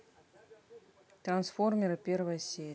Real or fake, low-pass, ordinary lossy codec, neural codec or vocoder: real; none; none; none